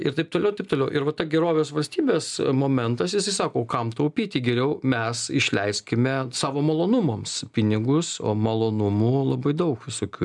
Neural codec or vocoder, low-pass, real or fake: none; 10.8 kHz; real